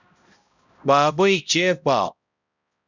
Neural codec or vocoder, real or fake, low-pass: codec, 16 kHz, 0.5 kbps, X-Codec, HuBERT features, trained on balanced general audio; fake; 7.2 kHz